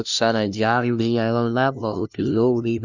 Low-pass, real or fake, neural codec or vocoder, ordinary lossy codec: 7.2 kHz; fake; codec, 16 kHz, 0.5 kbps, FunCodec, trained on LibriTTS, 25 frames a second; Opus, 64 kbps